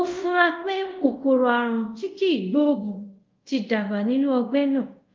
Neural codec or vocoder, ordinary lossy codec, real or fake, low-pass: codec, 24 kHz, 0.5 kbps, DualCodec; Opus, 32 kbps; fake; 7.2 kHz